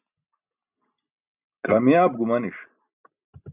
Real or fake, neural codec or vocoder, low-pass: real; none; 3.6 kHz